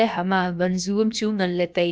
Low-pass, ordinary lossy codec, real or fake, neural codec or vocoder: none; none; fake; codec, 16 kHz, about 1 kbps, DyCAST, with the encoder's durations